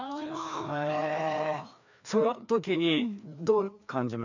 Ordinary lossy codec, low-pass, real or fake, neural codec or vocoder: none; 7.2 kHz; fake; codec, 16 kHz, 2 kbps, FreqCodec, larger model